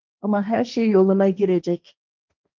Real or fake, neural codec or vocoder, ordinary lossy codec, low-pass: fake; codec, 16 kHz, 1.1 kbps, Voila-Tokenizer; Opus, 24 kbps; 7.2 kHz